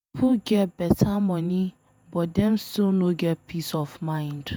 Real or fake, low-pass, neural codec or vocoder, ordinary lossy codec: fake; none; vocoder, 48 kHz, 128 mel bands, Vocos; none